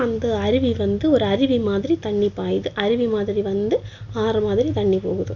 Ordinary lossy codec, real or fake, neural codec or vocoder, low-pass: none; real; none; 7.2 kHz